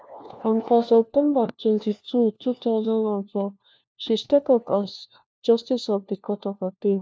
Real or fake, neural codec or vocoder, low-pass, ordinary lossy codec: fake; codec, 16 kHz, 1 kbps, FunCodec, trained on LibriTTS, 50 frames a second; none; none